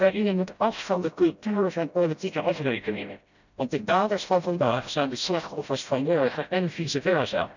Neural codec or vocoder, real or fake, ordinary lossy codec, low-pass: codec, 16 kHz, 0.5 kbps, FreqCodec, smaller model; fake; none; 7.2 kHz